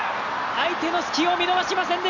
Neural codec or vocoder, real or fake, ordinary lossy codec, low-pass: none; real; none; 7.2 kHz